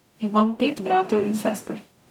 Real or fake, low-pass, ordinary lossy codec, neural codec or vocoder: fake; 19.8 kHz; none; codec, 44.1 kHz, 0.9 kbps, DAC